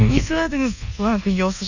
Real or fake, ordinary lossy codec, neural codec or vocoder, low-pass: fake; none; codec, 24 kHz, 1.2 kbps, DualCodec; 7.2 kHz